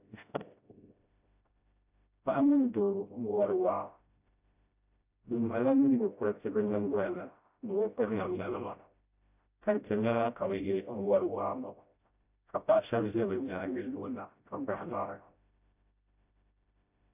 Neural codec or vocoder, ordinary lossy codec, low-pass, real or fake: codec, 16 kHz, 0.5 kbps, FreqCodec, smaller model; AAC, 32 kbps; 3.6 kHz; fake